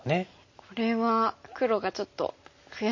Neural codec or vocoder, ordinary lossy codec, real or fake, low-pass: none; MP3, 32 kbps; real; 7.2 kHz